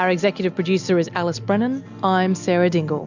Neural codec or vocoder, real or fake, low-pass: none; real; 7.2 kHz